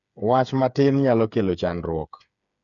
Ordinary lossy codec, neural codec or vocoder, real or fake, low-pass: none; codec, 16 kHz, 8 kbps, FreqCodec, smaller model; fake; 7.2 kHz